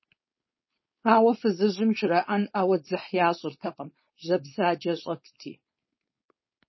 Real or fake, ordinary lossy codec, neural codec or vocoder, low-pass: fake; MP3, 24 kbps; codec, 16 kHz, 4.8 kbps, FACodec; 7.2 kHz